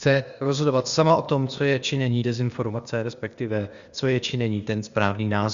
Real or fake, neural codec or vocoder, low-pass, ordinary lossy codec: fake; codec, 16 kHz, 0.8 kbps, ZipCodec; 7.2 kHz; Opus, 64 kbps